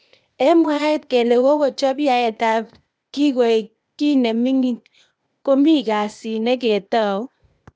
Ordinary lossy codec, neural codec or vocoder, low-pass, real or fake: none; codec, 16 kHz, 0.8 kbps, ZipCodec; none; fake